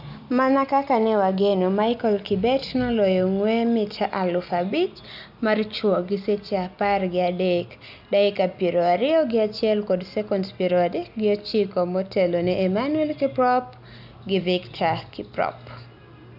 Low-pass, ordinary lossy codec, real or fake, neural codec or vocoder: 5.4 kHz; none; real; none